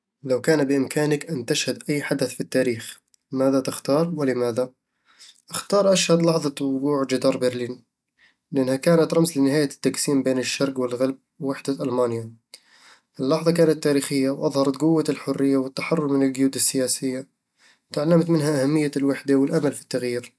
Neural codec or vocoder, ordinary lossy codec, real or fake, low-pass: none; none; real; none